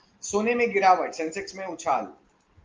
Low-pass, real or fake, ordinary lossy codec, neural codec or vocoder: 7.2 kHz; real; Opus, 32 kbps; none